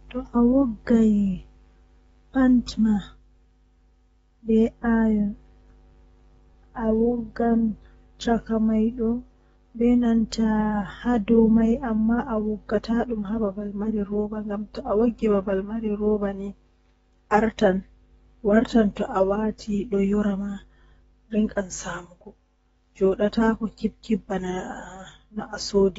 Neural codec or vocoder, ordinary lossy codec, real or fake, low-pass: codec, 44.1 kHz, 7.8 kbps, DAC; AAC, 24 kbps; fake; 19.8 kHz